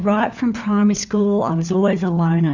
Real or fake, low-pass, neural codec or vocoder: fake; 7.2 kHz; codec, 16 kHz, 4 kbps, FunCodec, trained on LibriTTS, 50 frames a second